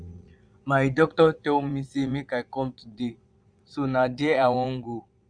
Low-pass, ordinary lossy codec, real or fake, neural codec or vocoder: 9.9 kHz; none; fake; vocoder, 22.05 kHz, 80 mel bands, Vocos